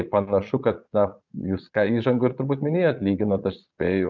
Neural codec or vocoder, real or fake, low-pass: vocoder, 22.05 kHz, 80 mel bands, Vocos; fake; 7.2 kHz